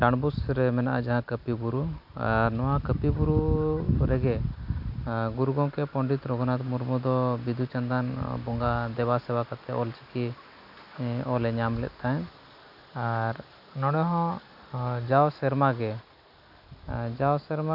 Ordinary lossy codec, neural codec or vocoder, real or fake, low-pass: none; none; real; 5.4 kHz